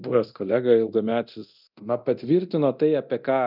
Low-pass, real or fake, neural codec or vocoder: 5.4 kHz; fake; codec, 24 kHz, 0.9 kbps, DualCodec